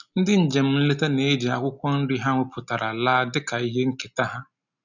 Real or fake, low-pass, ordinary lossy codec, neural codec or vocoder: real; 7.2 kHz; none; none